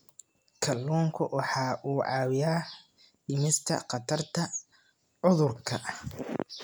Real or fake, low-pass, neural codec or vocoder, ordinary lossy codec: real; none; none; none